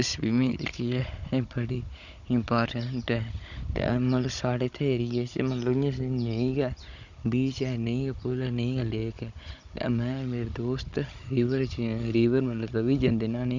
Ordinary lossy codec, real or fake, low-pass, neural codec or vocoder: none; fake; 7.2 kHz; codec, 16 kHz, 16 kbps, FunCodec, trained on Chinese and English, 50 frames a second